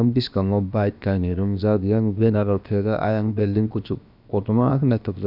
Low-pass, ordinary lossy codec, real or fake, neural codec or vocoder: 5.4 kHz; none; fake; codec, 16 kHz, about 1 kbps, DyCAST, with the encoder's durations